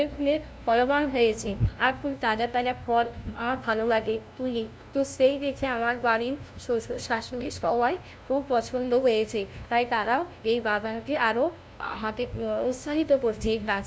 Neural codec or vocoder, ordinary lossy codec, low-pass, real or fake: codec, 16 kHz, 0.5 kbps, FunCodec, trained on LibriTTS, 25 frames a second; none; none; fake